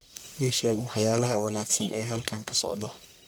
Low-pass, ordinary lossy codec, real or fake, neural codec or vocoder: none; none; fake; codec, 44.1 kHz, 1.7 kbps, Pupu-Codec